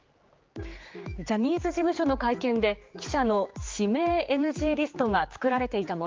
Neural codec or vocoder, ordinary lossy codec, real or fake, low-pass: codec, 16 kHz, 4 kbps, X-Codec, HuBERT features, trained on general audio; Opus, 24 kbps; fake; 7.2 kHz